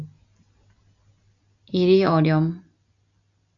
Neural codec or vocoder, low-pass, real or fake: none; 7.2 kHz; real